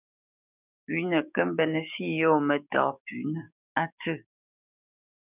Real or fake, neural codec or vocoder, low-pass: fake; codec, 16 kHz, 6 kbps, DAC; 3.6 kHz